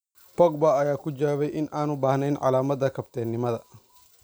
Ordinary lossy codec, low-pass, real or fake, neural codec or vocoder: none; none; real; none